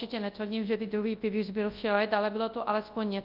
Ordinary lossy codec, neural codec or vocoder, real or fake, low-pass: Opus, 32 kbps; codec, 24 kHz, 0.9 kbps, WavTokenizer, large speech release; fake; 5.4 kHz